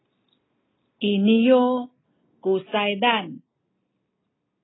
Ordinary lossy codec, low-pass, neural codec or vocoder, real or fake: AAC, 16 kbps; 7.2 kHz; none; real